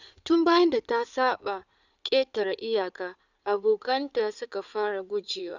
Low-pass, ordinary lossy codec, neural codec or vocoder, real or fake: 7.2 kHz; none; codec, 16 kHz in and 24 kHz out, 2.2 kbps, FireRedTTS-2 codec; fake